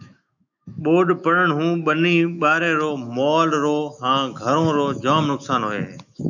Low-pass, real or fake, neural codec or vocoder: 7.2 kHz; fake; autoencoder, 48 kHz, 128 numbers a frame, DAC-VAE, trained on Japanese speech